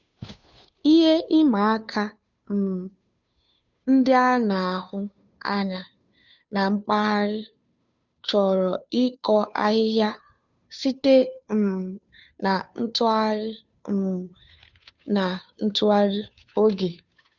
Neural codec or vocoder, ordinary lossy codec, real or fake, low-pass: codec, 16 kHz, 2 kbps, FunCodec, trained on Chinese and English, 25 frames a second; none; fake; 7.2 kHz